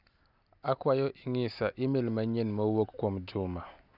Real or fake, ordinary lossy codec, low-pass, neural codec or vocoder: real; Opus, 64 kbps; 5.4 kHz; none